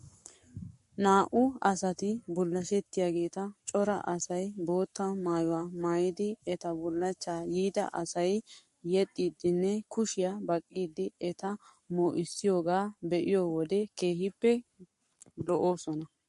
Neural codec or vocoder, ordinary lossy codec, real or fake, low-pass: codec, 44.1 kHz, 7.8 kbps, Pupu-Codec; MP3, 48 kbps; fake; 14.4 kHz